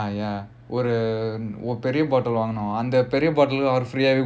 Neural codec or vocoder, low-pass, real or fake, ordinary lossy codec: none; none; real; none